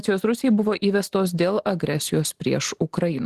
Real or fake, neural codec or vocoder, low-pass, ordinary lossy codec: real; none; 14.4 kHz; Opus, 16 kbps